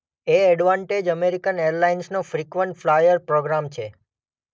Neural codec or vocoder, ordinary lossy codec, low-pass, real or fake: none; none; none; real